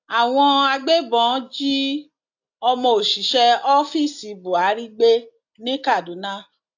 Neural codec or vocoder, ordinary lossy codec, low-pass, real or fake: none; AAC, 48 kbps; 7.2 kHz; real